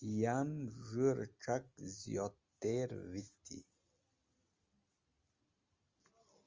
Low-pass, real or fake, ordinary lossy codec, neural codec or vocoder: 7.2 kHz; real; Opus, 32 kbps; none